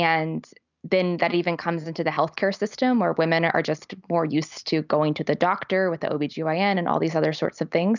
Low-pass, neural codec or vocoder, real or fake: 7.2 kHz; none; real